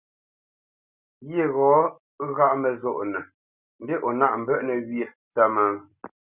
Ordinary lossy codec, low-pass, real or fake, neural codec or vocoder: Opus, 64 kbps; 3.6 kHz; real; none